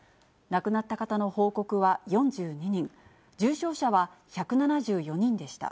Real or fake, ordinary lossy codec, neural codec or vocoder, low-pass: real; none; none; none